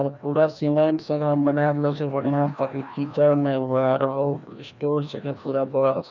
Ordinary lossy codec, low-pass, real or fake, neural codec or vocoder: none; 7.2 kHz; fake; codec, 16 kHz, 1 kbps, FreqCodec, larger model